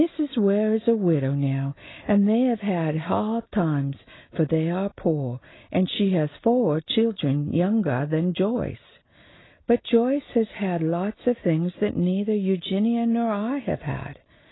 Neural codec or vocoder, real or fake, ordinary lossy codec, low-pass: none; real; AAC, 16 kbps; 7.2 kHz